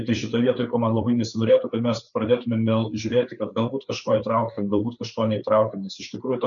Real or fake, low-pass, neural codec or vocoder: fake; 7.2 kHz; codec, 16 kHz, 4 kbps, FreqCodec, larger model